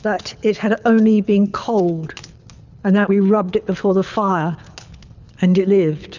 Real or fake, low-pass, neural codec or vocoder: fake; 7.2 kHz; codec, 24 kHz, 6 kbps, HILCodec